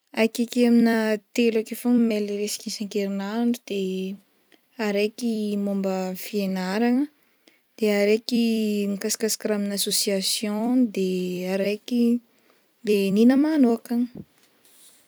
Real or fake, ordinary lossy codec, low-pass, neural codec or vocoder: fake; none; none; vocoder, 44.1 kHz, 128 mel bands every 256 samples, BigVGAN v2